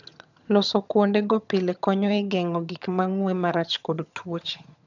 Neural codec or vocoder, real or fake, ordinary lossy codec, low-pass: vocoder, 22.05 kHz, 80 mel bands, HiFi-GAN; fake; none; 7.2 kHz